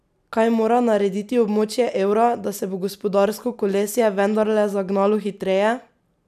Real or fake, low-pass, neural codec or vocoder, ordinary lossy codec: real; 14.4 kHz; none; none